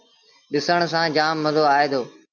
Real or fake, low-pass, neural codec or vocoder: real; 7.2 kHz; none